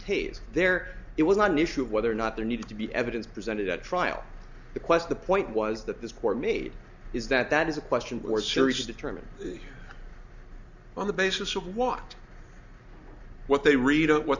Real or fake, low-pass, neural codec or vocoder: real; 7.2 kHz; none